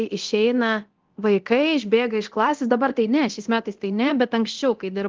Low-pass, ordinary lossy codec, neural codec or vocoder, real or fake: 7.2 kHz; Opus, 16 kbps; codec, 24 kHz, 0.9 kbps, DualCodec; fake